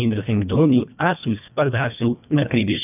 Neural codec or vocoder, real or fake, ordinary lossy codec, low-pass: codec, 24 kHz, 1.5 kbps, HILCodec; fake; none; 3.6 kHz